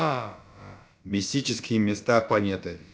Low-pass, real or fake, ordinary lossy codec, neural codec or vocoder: none; fake; none; codec, 16 kHz, about 1 kbps, DyCAST, with the encoder's durations